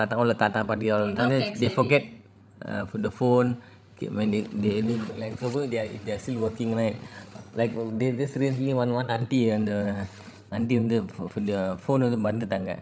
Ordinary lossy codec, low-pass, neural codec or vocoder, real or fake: none; none; codec, 16 kHz, 16 kbps, FreqCodec, larger model; fake